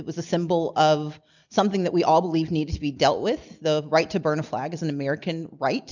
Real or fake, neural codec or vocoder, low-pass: real; none; 7.2 kHz